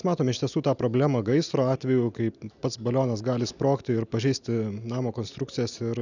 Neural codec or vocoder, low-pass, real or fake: none; 7.2 kHz; real